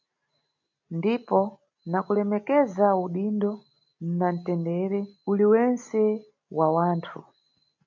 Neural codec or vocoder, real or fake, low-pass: none; real; 7.2 kHz